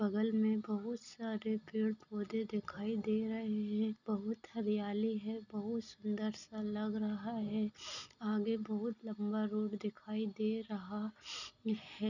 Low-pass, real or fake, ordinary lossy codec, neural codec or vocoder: 7.2 kHz; real; none; none